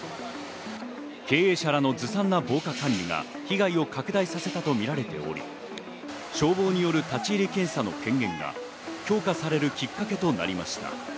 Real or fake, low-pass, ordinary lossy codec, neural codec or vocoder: real; none; none; none